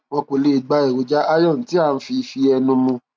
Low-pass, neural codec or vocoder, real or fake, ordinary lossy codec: none; none; real; none